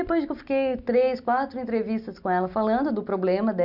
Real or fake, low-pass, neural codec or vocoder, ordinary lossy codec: real; 5.4 kHz; none; none